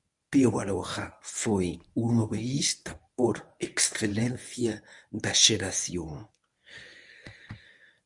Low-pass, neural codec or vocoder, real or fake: 10.8 kHz; codec, 24 kHz, 0.9 kbps, WavTokenizer, medium speech release version 1; fake